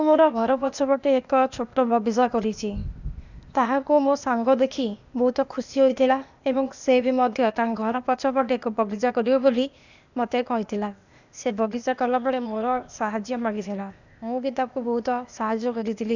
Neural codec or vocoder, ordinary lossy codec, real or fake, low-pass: codec, 16 kHz, 0.8 kbps, ZipCodec; none; fake; 7.2 kHz